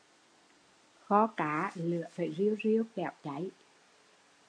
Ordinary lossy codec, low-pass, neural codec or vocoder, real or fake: MP3, 64 kbps; 9.9 kHz; none; real